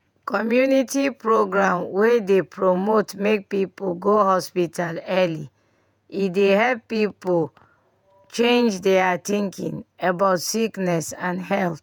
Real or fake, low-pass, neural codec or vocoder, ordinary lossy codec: fake; 19.8 kHz; vocoder, 48 kHz, 128 mel bands, Vocos; none